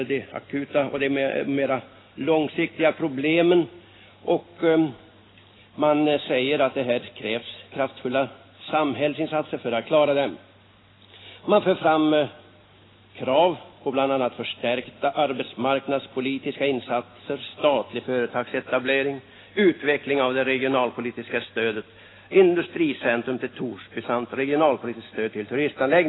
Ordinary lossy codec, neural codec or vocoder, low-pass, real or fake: AAC, 16 kbps; none; 7.2 kHz; real